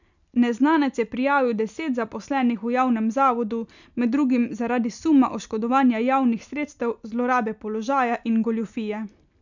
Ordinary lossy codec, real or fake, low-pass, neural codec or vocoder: none; real; 7.2 kHz; none